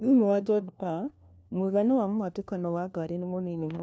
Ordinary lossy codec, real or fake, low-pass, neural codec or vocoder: none; fake; none; codec, 16 kHz, 1 kbps, FunCodec, trained on LibriTTS, 50 frames a second